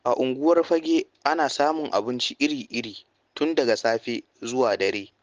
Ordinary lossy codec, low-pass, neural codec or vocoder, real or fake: Opus, 16 kbps; 7.2 kHz; none; real